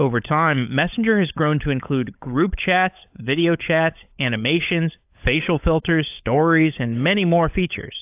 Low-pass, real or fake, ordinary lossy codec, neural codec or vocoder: 3.6 kHz; fake; AAC, 32 kbps; codec, 16 kHz, 16 kbps, FunCodec, trained on LibriTTS, 50 frames a second